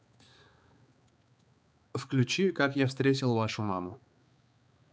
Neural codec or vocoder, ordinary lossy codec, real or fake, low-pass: codec, 16 kHz, 4 kbps, X-Codec, HuBERT features, trained on balanced general audio; none; fake; none